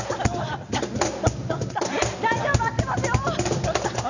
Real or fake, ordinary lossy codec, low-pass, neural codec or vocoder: real; none; 7.2 kHz; none